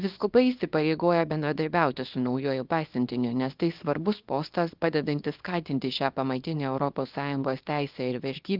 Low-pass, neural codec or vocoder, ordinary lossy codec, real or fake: 5.4 kHz; codec, 24 kHz, 0.9 kbps, WavTokenizer, small release; Opus, 24 kbps; fake